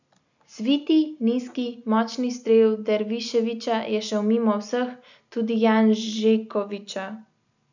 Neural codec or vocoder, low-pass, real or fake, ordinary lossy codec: none; 7.2 kHz; real; none